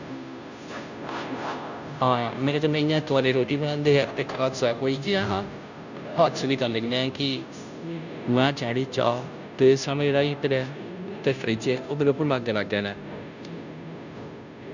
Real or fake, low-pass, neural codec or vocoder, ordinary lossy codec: fake; 7.2 kHz; codec, 16 kHz, 0.5 kbps, FunCodec, trained on Chinese and English, 25 frames a second; none